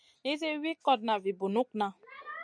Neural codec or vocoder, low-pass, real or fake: none; 9.9 kHz; real